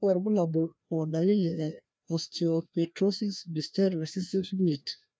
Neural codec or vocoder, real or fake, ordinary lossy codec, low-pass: codec, 16 kHz, 1 kbps, FreqCodec, larger model; fake; none; none